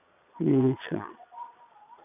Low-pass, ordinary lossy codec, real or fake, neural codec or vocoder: 3.6 kHz; none; fake; vocoder, 22.05 kHz, 80 mel bands, Vocos